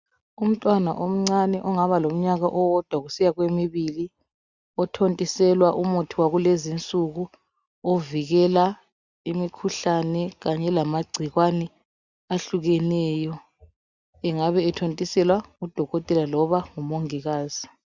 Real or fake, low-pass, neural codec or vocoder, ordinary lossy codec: real; 7.2 kHz; none; Opus, 64 kbps